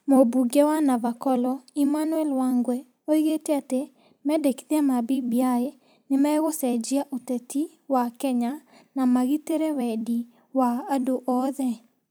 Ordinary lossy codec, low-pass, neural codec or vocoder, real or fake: none; none; vocoder, 44.1 kHz, 128 mel bands every 256 samples, BigVGAN v2; fake